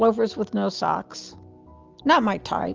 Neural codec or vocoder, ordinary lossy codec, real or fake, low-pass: none; Opus, 24 kbps; real; 7.2 kHz